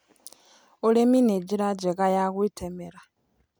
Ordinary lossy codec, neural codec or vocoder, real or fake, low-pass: none; none; real; none